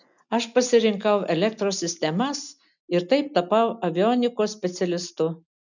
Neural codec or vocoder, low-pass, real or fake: none; 7.2 kHz; real